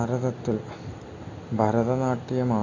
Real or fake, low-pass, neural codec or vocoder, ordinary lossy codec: real; 7.2 kHz; none; AAC, 32 kbps